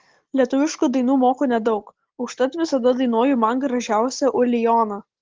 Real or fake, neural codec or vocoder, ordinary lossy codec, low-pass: real; none; Opus, 16 kbps; 7.2 kHz